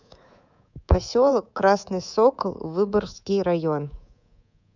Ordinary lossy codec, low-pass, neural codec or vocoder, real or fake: none; 7.2 kHz; codec, 24 kHz, 3.1 kbps, DualCodec; fake